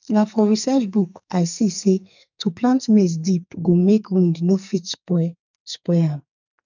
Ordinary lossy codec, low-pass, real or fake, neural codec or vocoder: none; 7.2 kHz; fake; codec, 44.1 kHz, 2.6 kbps, SNAC